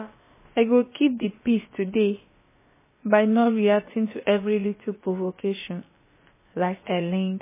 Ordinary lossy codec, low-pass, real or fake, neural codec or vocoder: MP3, 16 kbps; 3.6 kHz; fake; codec, 16 kHz, about 1 kbps, DyCAST, with the encoder's durations